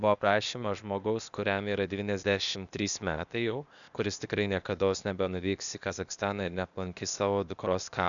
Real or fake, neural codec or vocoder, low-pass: fake; codec, 16 kHz, 0.8 kbps, ZipCodec; 7.2 kHz